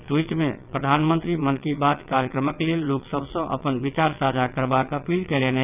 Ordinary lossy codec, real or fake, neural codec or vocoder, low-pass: none; fake; vocoder, 22.05 kHz, 80 mel bands, WaveNeXt; 3.6 kHz